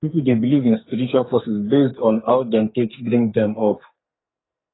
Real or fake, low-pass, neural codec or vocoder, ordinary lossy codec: fake; 7.2 kHz; codec, 44.1 kHz, 2.6 kbps, SNAC; AAC, 16 kbps